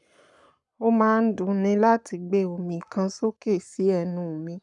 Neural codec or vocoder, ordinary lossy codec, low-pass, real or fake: codec, 44.1 kHz, 7.8 kbps, Pupu-Codec; none; 10.8 kHz; fake